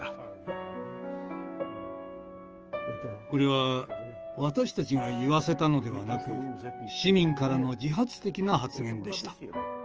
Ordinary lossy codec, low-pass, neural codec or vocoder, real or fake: Opus, 24 kbps; 7.2 kHz; codec, 16 kHz, 6 kbps, DAC; fake